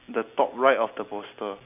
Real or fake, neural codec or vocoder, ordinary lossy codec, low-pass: real; none; none; 3.6 kHz